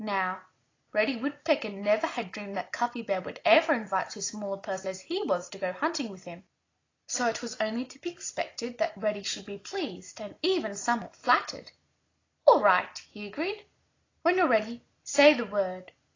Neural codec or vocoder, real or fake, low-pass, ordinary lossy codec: none; real; 7.2 kHz; AAC, 32 kbps